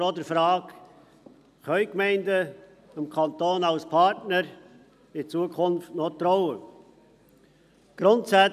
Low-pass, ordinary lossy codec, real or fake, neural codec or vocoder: 14.4 kHz; none; real; none